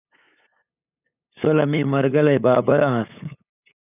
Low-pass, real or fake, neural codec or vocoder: 3.6 kHz; fake; codec, 16 kHz, 8 kbps, FunCodec, trained on LibriTTS, 25 frames a second